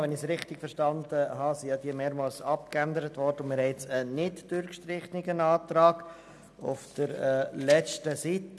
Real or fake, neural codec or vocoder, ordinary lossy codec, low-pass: real; none; none; none